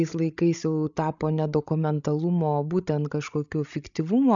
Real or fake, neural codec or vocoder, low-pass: fake; codec, 16 kHz, 16 kbps, FreqCodec, larger model; 7.2 kHz